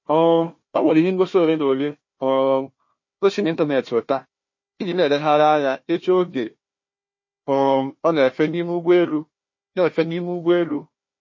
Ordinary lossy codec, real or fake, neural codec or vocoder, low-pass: MP3, 32 kbps; fake; codec, 16 kHz, 1 kbps, FunCodec, trained on Chinese and English, 50 frames a second; 7.2 kHz